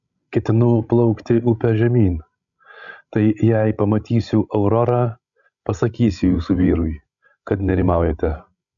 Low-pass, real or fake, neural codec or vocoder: 7.2 kHz; fake; codec, 16 kHz, 16 kbps, FreqCodec, larger model